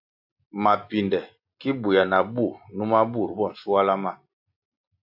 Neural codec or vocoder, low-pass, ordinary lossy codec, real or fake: none; 5.4 kHz; AAC, 48 kbps; real